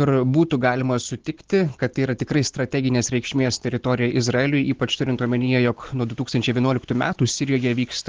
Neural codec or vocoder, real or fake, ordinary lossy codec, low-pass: none; real; Opus, 16 kbps; 7.2 kHz